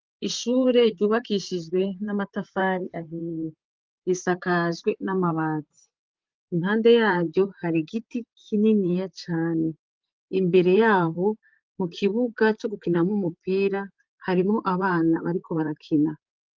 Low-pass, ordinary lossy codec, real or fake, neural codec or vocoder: 7.2 kHz; Opus, 32 kbps; fake; vocoder, 44.1 kHz, 128 mel bands, Pupu-Vocoder